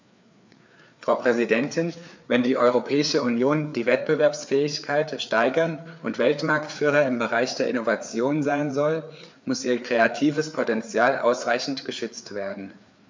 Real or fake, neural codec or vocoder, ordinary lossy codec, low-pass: fake; codec, 16 kHz, 4 kbps, FreqCodec, larger model; MP3, 64 kbps; 7.2 kHz